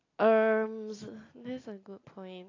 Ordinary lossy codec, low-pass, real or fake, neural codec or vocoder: AAC, 32 kbps; 7.2 kHz; real; none